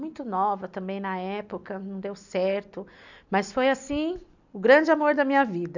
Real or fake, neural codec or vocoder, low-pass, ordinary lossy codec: real; none; 7.2 kHz; none